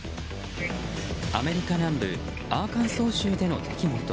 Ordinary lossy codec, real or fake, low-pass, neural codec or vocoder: none; real; none; none